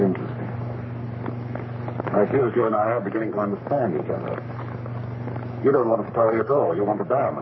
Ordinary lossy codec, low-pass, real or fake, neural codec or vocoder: MP3, 24 kbps; 7.2 kHz; fake; codec, 44.1 kHz, 3.4 kbps, Pupu-Codec